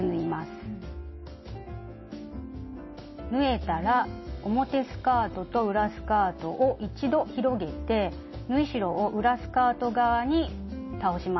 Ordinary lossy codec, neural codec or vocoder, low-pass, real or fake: MP3, 24 kbps; none; 7.2 kHz; real